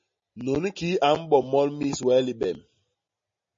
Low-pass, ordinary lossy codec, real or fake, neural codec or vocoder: 7.2 kHz; MP3, 32 kbps; real; none